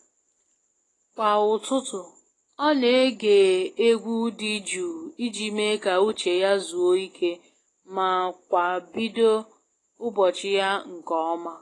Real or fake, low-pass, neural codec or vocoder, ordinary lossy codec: real; 10.8 kHz; none; AAC, 32 kbps